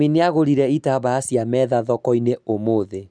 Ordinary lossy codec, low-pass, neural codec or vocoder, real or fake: none; 9.9 kHz; none; real